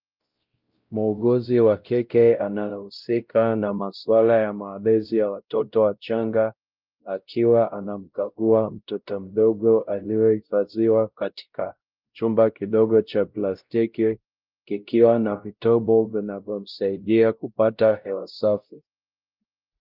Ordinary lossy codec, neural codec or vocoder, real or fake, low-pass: Opus, 24 kbps; codec, 16 kHz, 0.5 kbps, X-Codec, WavLM features, trained on Multilingual LibriSpeech; fake; 5.4 kHz